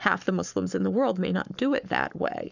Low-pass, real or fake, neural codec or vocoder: 7.2 kHz; fake; codec, 44.1 kHz, 7.8 kbps, Pupu-Codec